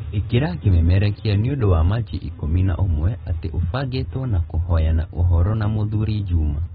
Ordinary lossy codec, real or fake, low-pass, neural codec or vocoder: AAC, 16 kbps; real; 19.8 kHz; none